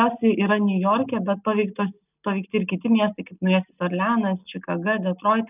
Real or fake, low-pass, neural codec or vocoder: real; 3.6 kHz; none